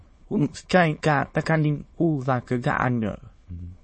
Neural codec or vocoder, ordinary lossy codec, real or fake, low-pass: autoencoder, 22.05 kHz, a latent of 192 numbers a frame, VITS, trained on many speakers; MP3, 32 kbps; fake; 9.9 kHz